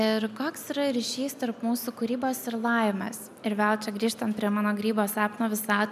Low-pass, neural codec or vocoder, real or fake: 14.4 kHz; none; real